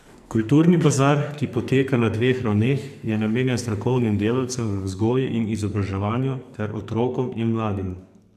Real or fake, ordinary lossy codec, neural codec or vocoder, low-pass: fake; none; codec, 44.1 kHz, 2.6 kbps, SNAC; 14.4 kHz